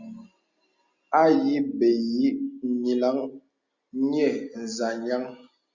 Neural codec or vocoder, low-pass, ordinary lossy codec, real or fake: none; 7.2 kHz; Opus, 64 kbps; real